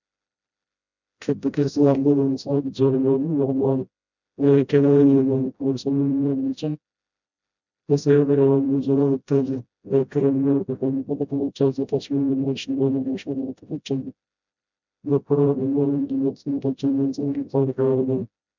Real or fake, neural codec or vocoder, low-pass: fake; codec, 16 kHz, 0.5 kbps, FreqCodec, smaller model; 7.2 kHz